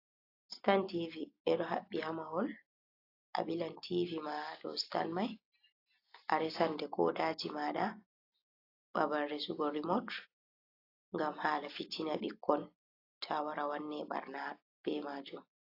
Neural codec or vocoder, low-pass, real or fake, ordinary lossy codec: none; 5.4 kHz; real; AAC, 32 kbps